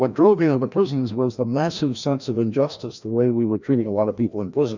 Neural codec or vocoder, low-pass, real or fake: codec, 16 kHz, 1 kbps, FreqCodec, larger model; 7.2 kHz; fake